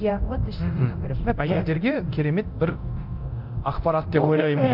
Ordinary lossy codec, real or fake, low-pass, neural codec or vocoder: none; fake; 5.4 kHz; codec, 24 kHz, 0.9 kbps, DualCodec